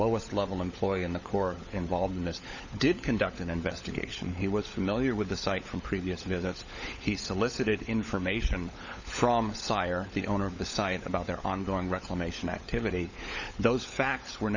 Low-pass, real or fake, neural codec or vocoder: 7.2 kHz; fake; codec, 16 kHz, 16 kbps, FunCodec, trained on LibriTTS, 50 frames a second